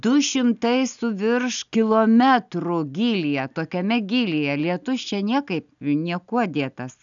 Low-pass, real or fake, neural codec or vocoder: 7.2 kHz; real; none